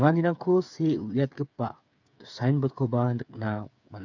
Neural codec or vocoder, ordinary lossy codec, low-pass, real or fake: codec, 16 kHz, 8 kbps, FreqCodec, smaller model; none; 7.2 kHz; fake